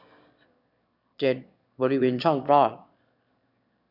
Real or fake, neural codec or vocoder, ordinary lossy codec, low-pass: fake; autoencoder, 22.05 kHz, a latent of 192 numbers a frame, VITS, trained on one speaker; none; 5.4 kHz